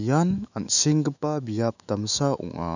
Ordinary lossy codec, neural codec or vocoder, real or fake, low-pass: none; autoencoder, 48 kHz, 128 numbers a frame, DAC-VAE, trained on Japanese speech; fake; 7.2 kHz